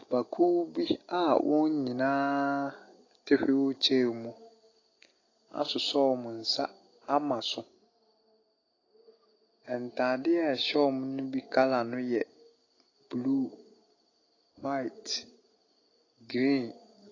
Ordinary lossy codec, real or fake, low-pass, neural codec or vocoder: AAC, 32 kbps; real; 7.2 kHz; none